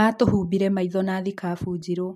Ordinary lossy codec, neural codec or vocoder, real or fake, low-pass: MP3, 64 kbps; none; real; 14.4 kHz